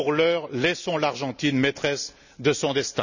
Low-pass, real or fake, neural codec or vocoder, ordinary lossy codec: 7.2 kHz; real; none; none